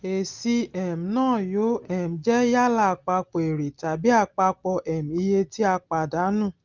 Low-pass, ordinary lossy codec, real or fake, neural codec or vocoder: 7.2 kHz; Opus, 24 kbps; real; none